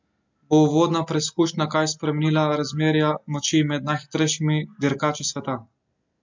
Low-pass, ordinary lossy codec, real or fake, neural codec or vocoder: 7.2 kHz; MP3, 64 kbps; real; none